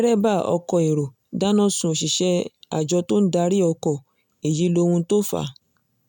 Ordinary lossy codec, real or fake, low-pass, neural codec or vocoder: none; real; 19.8 kHz; none